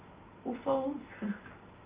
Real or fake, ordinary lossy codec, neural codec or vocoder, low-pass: real; Opus, 24 kbps; none; 3.6 kHz